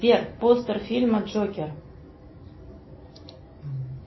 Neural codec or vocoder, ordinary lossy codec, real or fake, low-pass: none; MP3, 24 kbps; real; 7.2 kHz